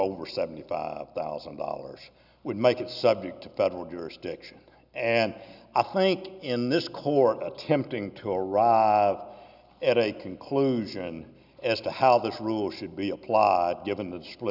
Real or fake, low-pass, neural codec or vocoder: real; 5.4 kHz; none